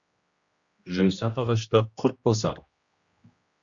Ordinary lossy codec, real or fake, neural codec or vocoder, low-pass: Opus, 64 kbps; fake; codec, 16 kHz, 1 kbps, X-Codec, HuBERT features, trained on general audio; 7.2 kHz